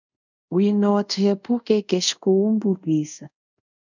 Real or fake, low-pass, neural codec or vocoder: fake; 7.2 kHz; codec, 24 kHz, 0.5 kbps, DualCodec